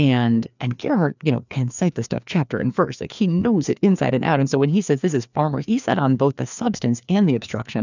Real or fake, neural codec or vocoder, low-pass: fake; codec, 16 kHz, 2 kbps, FreqCodec, larger model; 7.2 kHz